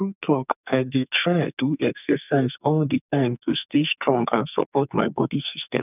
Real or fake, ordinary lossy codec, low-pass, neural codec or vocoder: fake; none; 3.6 kHz; codec, 44.1 kHz, 2.6 kbps, SNAC